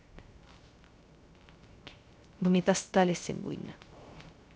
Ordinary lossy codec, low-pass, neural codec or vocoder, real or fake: none; none; codec, 16 kHz, 0.3 kbps, FocalCodec; fake